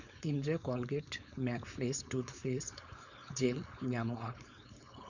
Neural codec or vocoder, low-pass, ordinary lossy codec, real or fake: codec, 16 kHz, 4.8 kbps, FACodec; 7.2 kHz; none; fake